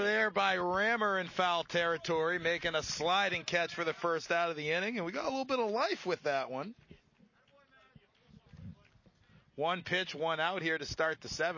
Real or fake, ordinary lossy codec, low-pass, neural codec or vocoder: real; MP3, 32 kbps; 7.2 kHz; none